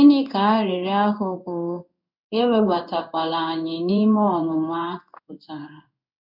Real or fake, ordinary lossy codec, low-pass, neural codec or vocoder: fake; none; 5.4 kHz; codec, 16 kHz in and 24 kHz out, 1 kbps, XY-Tokenizer